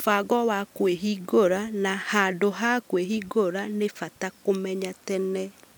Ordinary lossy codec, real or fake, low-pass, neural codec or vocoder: none; real; none; none